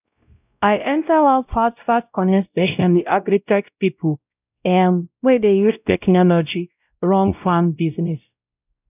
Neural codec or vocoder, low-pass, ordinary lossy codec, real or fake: codec, 16 kHz, 0.5 kbps, X-Codec, WavLM features, trained on Multilingual LibriSpeech; 3.6 kHz; none; fake